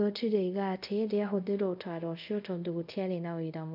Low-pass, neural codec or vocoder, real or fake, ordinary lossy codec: 5.4 kHz; codec, 24 kHz, 0.5 kbps, DualCodec; fake; none